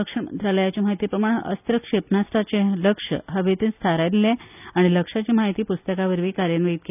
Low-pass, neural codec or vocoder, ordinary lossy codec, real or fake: 3.6 kHz; none; none; real